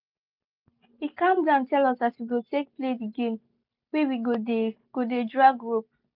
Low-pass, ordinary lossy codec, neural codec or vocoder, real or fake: 5.4 kHz; none; none; real